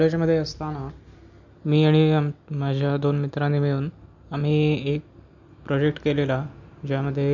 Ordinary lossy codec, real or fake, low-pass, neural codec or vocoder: none; real; 7.2 kHz; none